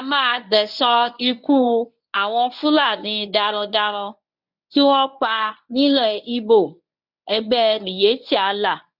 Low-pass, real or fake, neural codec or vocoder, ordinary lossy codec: 5.4 kHz; fake; codec, 24 kHz, 0.9 kbps, WavTokenizer, medium speech release version 1; none